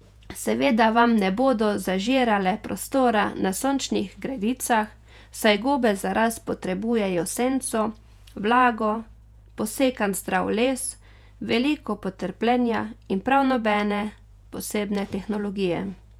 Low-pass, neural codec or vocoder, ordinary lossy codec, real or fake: 19.8 kHz; vocoder, 48 kHz, 128 mel bands, Vocos; none; fake